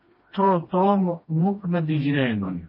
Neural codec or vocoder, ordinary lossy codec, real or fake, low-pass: codec, 16 kHz, 1 kbps, FreqCodec, smaller model; MP3, 24 kbps; fake; 5.4 kHz